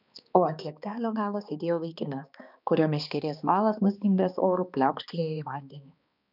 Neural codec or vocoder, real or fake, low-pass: codec, 16 kHz, 2 kbps, X-Codec, HuBERT features, trained on balanced general audio; fake; 5.4 kHz